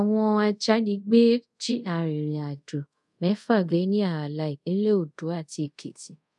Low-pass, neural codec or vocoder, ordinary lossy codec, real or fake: 10.8 kHz; codec, 24 kHz, 0.5 kbps, DualCodec; none; fake